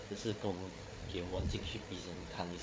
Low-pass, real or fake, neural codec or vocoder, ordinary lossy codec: none; fake; codec, 16 kHz, 8 kbps, FreqCodec, larger model; none